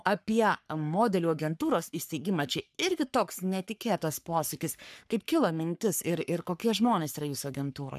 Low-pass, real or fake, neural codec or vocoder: 14.4 kHz; fake; codec, 44.1 kHz, 3.4 kbps, Pupu-Codec